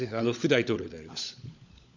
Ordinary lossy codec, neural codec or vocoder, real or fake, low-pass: none; codec, 16 kHz, 16 kbps, FunCodec, trained on LibriTTS, 50 frames a second; fake; 7.2 kHz